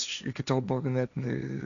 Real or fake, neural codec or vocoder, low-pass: fake; codec, 16 kHz, 1.1 kbps, Voila-Tokenizer; 7.2 kHz